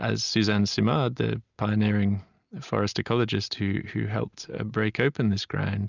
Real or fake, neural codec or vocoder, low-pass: real; none; 7.2 kHz